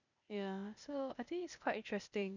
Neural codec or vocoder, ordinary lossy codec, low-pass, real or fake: codec, 16 kHz, 0.8 kbps, ZipCodec; none; 7.2 kHz; fake